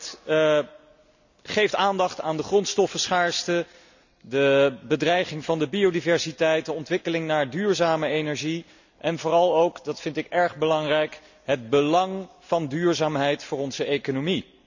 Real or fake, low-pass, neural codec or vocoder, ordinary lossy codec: real; 7.2 kHz; none; none